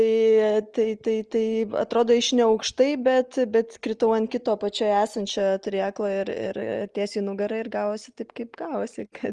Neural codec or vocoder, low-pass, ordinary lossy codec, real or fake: none; 10.8 kHz; Opus, 24 kbps; real